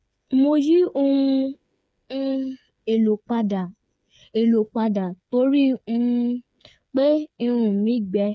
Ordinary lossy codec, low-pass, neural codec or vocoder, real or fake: none; none; codec, 16 kHz, 8 kbps, FreqCodec, smaller model; fake